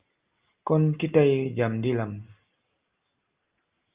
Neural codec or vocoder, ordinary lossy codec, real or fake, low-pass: none; Opus, 24 kbps; real; 3.6 kHz